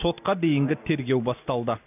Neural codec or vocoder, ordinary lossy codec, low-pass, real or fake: none; none; 3.6 kHz; real